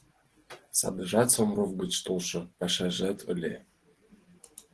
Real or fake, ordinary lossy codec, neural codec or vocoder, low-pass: real; Opus, 16 kbps; none; 10.8 kHz